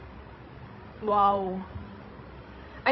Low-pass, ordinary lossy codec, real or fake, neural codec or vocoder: 7.2 kHz; MP3, 24 kbps; fake; codec, 16 kHz, 16 kbps, FreqCodec, larger model